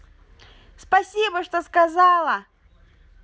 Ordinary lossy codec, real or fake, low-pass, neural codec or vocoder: none; real; none; none